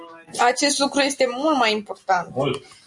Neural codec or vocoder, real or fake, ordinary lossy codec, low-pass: none; real; MP3, 96 kbps; 10.8 kHz